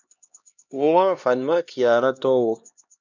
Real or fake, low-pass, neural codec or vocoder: fake; 7.2 kHz; codec, 16 kHz, 1 kbps, X-Codec, HuBERT features, trained on LibriSpeech